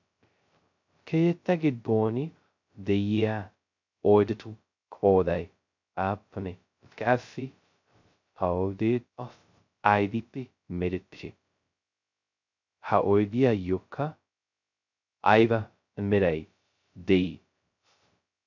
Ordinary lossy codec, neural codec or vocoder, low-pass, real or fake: MP3, 64 kbps; codec, 16 kHz, 0.2 kbps, FocalCodec; 7.2 kHz; fake